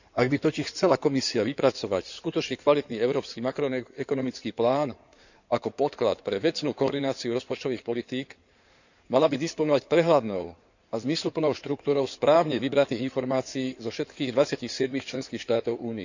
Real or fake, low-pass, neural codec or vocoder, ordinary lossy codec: fake; 7.2 kHz; codec, 16 kHz in and 24 kHz out, 2.2 kbps, FireRedTTS-2 codec; none